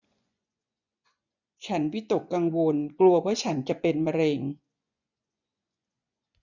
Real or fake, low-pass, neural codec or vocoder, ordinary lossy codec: fake; 7.2 kHz; vocoder, 24 kHz, 100 mel bands, Vocos; none